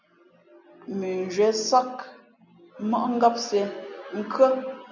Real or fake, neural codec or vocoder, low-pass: real; none; 7.2 kHz